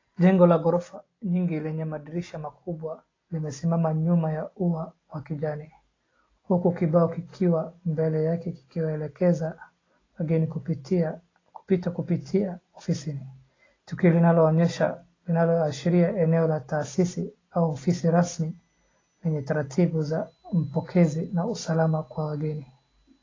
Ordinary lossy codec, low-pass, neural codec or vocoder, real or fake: AAC, 32 kbps; 7.2 kHz; none; real